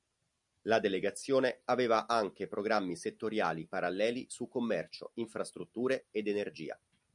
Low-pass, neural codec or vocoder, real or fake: 10.8 kHz; none; real